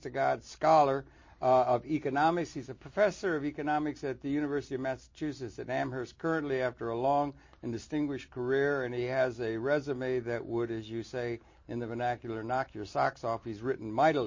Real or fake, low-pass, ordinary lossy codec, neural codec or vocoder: real; 7.2 kHz; MP3, 32 kbps; none